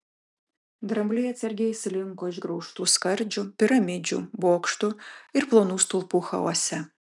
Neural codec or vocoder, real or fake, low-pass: none; real; 10.8 kHz